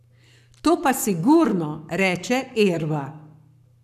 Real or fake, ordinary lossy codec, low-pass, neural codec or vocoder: fake; none; 14.4 kHz; codec, 44.1 kHz, 7.8 kbps, Pupu-Codec